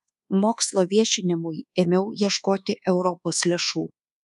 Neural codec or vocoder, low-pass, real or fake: codec, 24 kHz, 1.2 kbps, DualCodec; 10.8 kHz; fake